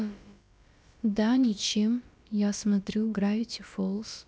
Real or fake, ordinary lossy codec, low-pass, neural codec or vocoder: fake; none; none; codec, 16 kHz, about 1 kbps, DyCAST, with the encoder's durations